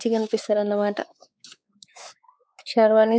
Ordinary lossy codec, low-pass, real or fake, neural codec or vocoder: none; none; fake; codec, 16 kHz, 4 kbps, X-Codec, WavLM features, trained on Multilingual LibriSpeech